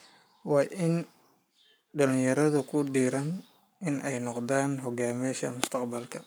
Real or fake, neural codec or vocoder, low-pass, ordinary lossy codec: fake; codec, 44.1 kHz, 7.8 kbps, Pupu-Codec; none; none